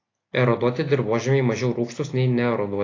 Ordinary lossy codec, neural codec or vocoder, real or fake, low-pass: AAC, 32 kbps; none; real; 7.2 kHz